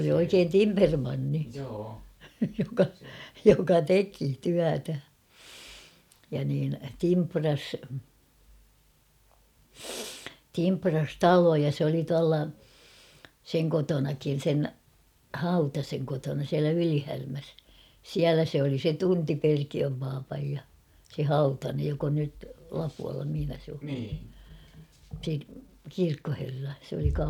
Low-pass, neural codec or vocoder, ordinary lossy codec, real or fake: 19.8 kHz; none; none; real